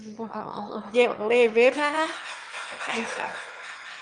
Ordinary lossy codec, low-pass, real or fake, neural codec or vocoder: Opus, 32 kbps; 9.9 kHz; fake; autoencoder, 22.05 kHz, a latent of 192 numbers a frame, VITS, trained on one speaker